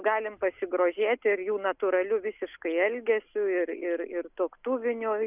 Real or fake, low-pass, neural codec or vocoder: real; 3.6 kHz; none